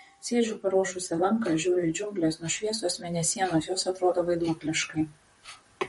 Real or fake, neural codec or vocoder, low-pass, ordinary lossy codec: fake; vocoder, 44.1 kHz, 128 mel bands, Pupu-Vocoder; 19.8 kHz; MP3, 48 kbps